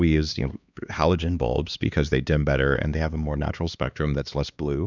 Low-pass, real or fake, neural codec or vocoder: 7.2 kHz; fake; codec, 16 kHz, 2 kbps, X-Codec, HuBERT features, trained on LibriSpeech